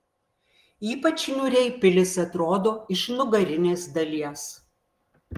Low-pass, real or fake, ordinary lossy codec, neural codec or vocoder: 14.4 kHz; real; Opus, 24 kbps; none